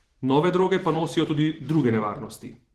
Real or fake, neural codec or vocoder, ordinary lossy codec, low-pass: fake; vocoder, 44.1 kHz, 128 mel bands every 512 samples, BigVGAN v2; Opus, 16 kbps; 14.4 kHz